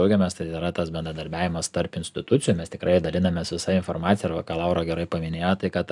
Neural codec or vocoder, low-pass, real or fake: none; 10.8 kHz; real